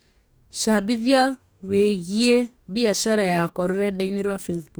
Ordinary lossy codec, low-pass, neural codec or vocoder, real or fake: none; none; codec, 44.1 kHz, 2.6 kbps, DAC; fake